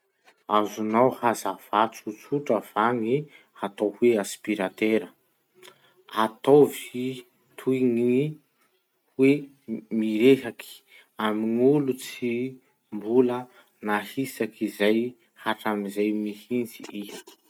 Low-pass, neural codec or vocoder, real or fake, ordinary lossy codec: 19.8 kHz; none; real; none